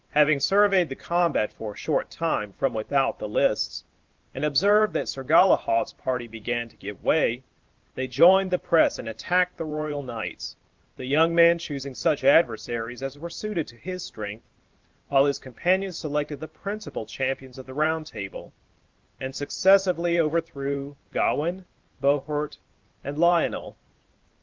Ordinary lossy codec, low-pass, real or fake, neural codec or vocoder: Opus, 24 kbps; 7.2 kHz; fake; vocoder, 44.1 kHz, 128 mel bands every 512 samples, BigVGAN v2